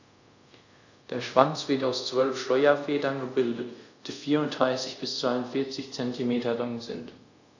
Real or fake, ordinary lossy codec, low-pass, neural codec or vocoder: fake; none; 7.2 kHz; codec, 24 kHz, 0.5 kbps, DualCodec